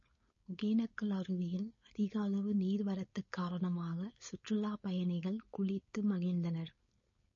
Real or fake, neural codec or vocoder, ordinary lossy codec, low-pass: fake; codec, 16 kHz, 4.8 kbps, FACodec; MP3, 32 kbps; 7.2 kHz